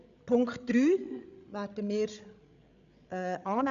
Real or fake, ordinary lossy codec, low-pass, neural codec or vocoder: fake; none; 7.2 kHz; codec, 16 kHz, 16 kbps, FreqCodec, larger model